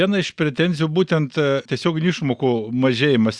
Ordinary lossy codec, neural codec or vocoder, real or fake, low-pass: Opus, 64 kbps; none; real; 9.9 kHz